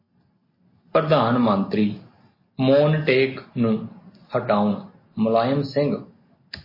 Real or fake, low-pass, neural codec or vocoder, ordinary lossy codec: real; 5.4 kHz; none; MP3, 24 kbps